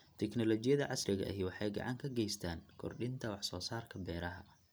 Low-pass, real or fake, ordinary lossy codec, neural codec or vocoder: none; real; none; none